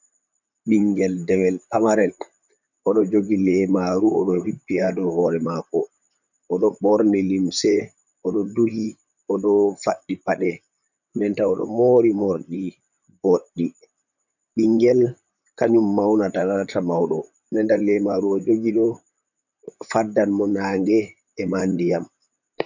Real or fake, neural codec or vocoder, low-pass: fake; vocoder, 44.1 kHz, 128 mel bands, Pupu-Vocoder; 7.2 kHz